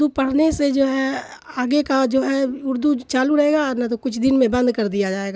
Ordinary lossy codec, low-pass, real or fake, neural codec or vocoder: none; none; real; none